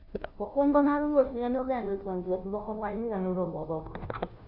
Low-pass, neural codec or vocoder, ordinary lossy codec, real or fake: 5.4 kHz; codec, 16 kHz, 0.5 kbps, FunCodec, trained on Chinese and English, 25 frames a second; none; fake